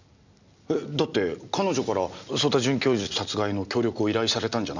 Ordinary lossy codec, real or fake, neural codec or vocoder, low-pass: none; real; none; 7.2 kHz